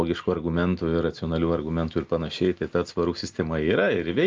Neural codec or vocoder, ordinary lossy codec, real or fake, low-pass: none; Opus, 24 kbps; real; 7.2 kHz